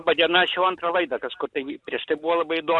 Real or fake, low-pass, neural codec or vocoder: real; 10.8 kHz; none